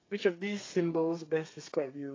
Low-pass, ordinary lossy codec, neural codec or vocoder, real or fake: 7.2 kHz; AAC, 32 kbps; codec, 32 kHz, 1.9 kbps, SNAC; fake